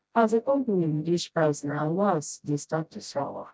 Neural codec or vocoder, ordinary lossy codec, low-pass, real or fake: codec, 16 kHz, 0.5 kbps, FreqCodec, smaller model; none; none; fake